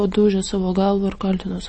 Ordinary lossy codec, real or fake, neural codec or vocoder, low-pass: MP3, 32 kbps; real; none; 9.9 kHz